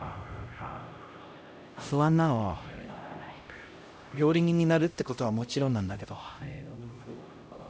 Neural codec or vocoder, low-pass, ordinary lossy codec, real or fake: codec, 16 kHz, 0.5 kbps, X-Codec, HuBERT features, trained on LibriSpeech; none; none; fake